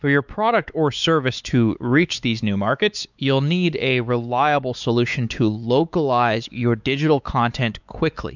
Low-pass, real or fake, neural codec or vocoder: 7.2 kHz; fake; codec, 16 kHz, 4 kbps, X-Codec, WavLM features, trained on Multilingual LibriSpeech